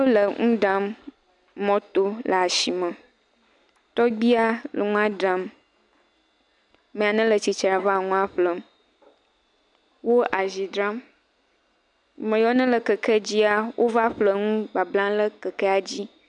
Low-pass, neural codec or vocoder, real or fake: 10.8 kHz; none; real